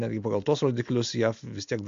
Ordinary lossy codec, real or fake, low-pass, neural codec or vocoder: MP3, 64 kbps; real; 7.2 kHz; none